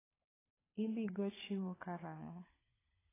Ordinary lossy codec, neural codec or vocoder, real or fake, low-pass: AAC, 16 kbps; codec, 16 kHz, 16 kbps, FunCodec, trained on LibriTTS, 50 frames a second; fake; 3.6 kHz